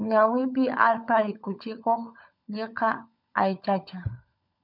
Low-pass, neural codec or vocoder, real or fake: 5.4 kHz; codec, 16 kHz, 16 kbps, FunCodec, trained on LibriTTS, 50 frames a second; fake